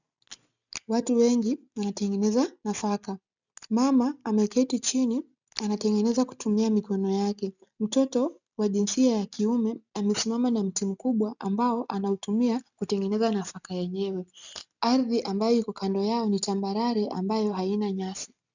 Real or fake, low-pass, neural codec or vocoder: real; 7.2 kHz; none